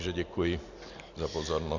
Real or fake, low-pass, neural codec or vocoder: real; 7.2 kHz; none